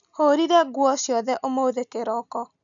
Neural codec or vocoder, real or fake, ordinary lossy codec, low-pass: none; real; none; 7.2 kHz